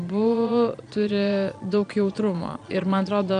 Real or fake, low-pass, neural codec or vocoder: fake; 9.9 kHz; vocoder, 22.05 kHz, 80 mel bands, Vocos